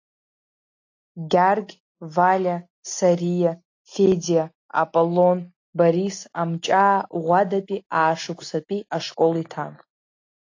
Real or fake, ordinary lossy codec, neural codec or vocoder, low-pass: real; AAC, 48 kbps; none; 7.2 kHz